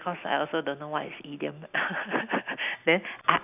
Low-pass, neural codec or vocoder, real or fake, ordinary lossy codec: 3.6 kHz; none; real; none